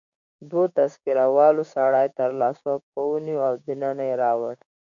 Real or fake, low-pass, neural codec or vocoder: fake; 7.2 kHz; codec, 16 kHz, 6 kbps, DAC